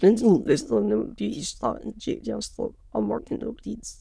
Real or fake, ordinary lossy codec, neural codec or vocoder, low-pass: fake; none; autoencoder, 22.05 kHz, a latent of 192 numbers a frame, VITS, trained on many speakers; none